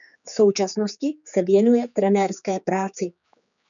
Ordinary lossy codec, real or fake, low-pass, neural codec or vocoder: MP3, 96 kbps; fake; 7.2 kHz; codec, 16 kHz, 4 kbps, X-Codec, HuBERT features, trained on general audio